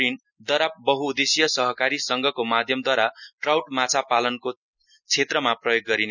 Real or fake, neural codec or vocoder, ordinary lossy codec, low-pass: real; none; none; 7.2 kHz